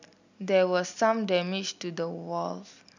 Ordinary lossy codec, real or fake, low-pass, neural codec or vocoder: none; real; 7.2 kHz; none